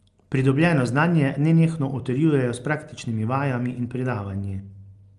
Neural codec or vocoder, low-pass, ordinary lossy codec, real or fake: none; 10.8 kHz; Opus, 32 kbps; real